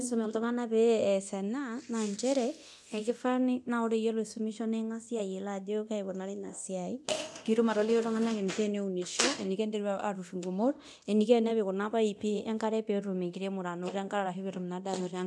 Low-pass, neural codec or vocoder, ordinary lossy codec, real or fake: none; codec, 24 kHz, 0.9 kbps, DualCodec; none; fake